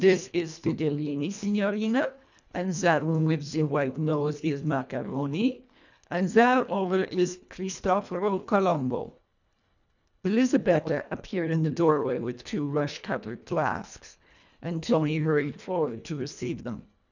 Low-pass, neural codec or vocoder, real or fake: 7.2 kHz; codec, 24 kHz, 1.5 kbps, HILCodec; fake